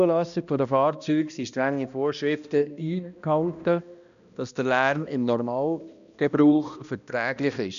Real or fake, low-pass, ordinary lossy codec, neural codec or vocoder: fake; 7.2 kHz; none; codec, 16 kHz, 1 kbps, X-Codec, HuBERT features, trained on balanced general audio